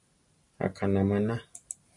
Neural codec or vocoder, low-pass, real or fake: none; 10.8 kHz; real